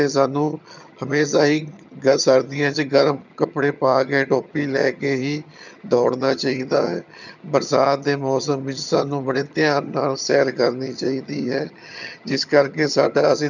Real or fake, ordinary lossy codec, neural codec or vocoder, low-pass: fake; none; vocoder, 22.05 kHz, 80 mel bands, HiFi-GAN; 7.2 kHz